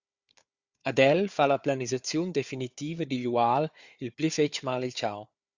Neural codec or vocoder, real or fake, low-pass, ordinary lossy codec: codec, 16 kHz, 4 kbps, FunCodec, trained on Chinese and English, 50 frames a second; fake; 7.2 kHz; Opus, 64 kbps